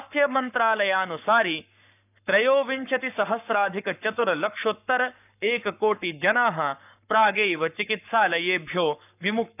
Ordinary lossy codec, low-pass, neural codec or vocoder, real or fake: none; 3.6 kHz; codec, 44.1 kHz, 7.8 kbps, Pupu-Codec; fake